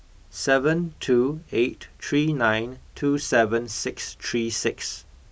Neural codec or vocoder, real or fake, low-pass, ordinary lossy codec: none; real; none; none